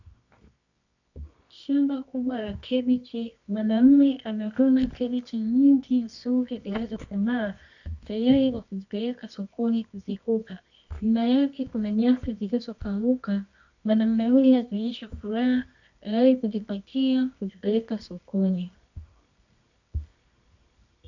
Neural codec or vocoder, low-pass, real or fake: codec, 24 kHz, 0.9 kbps, WavTokenizer, medium music audio release; 7.2 kHz; fake